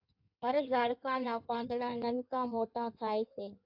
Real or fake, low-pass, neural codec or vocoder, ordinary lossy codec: fake; 5.4 kHz; codec, 16 kHz in and 24 kHz out, 1.1 kbps, FireRedTTS-2 codec; AAC, 48 kbps